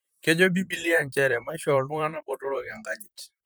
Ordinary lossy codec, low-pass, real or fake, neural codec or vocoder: none; none; fake; vocoder, 44.1 kHz, 128 mel bands, Pupu-Vocoder